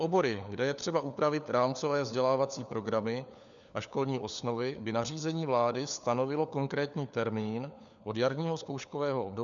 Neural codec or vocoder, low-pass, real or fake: codec, 16 kHz, 4 kbps, FunCodec, trained on LibriTTS, 50 frames a second; 7.2 kHz; fake